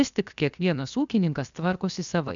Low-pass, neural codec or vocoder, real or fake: 7.2 kHz; codec, 16 kHz, 0.7 kbps, FocalCodec; fake